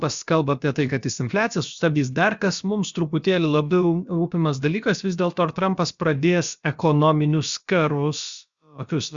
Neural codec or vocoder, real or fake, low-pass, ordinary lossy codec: codec, 16 kHz, about 1 kbps, DyCAST, with the encoder's durations; fake; 7.2 kHz; Opus, 64 kbps